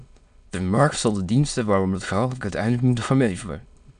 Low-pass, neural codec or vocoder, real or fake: 9.9 kHz; autoencoder, 22.05 kHz, a latent of 192 numbers a frame, VITS, trained on many speakers; fake